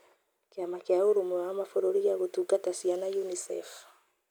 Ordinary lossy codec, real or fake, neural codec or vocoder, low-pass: none; real; none; none